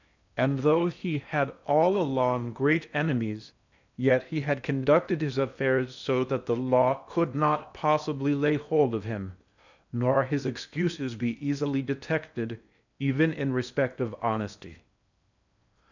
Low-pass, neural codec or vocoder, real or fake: 7.2 kHz; codec, 16 kHz in and 24 kHz out, 0.8 kbps, FocalCodec, streaming, 65536 codes; fake